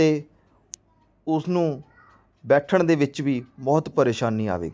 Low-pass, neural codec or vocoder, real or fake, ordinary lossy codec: none; none; real; none